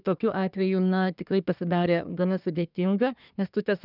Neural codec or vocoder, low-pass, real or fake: codec, 24 kHz, 1 kbps, SNAC; 5.4 kHz; fake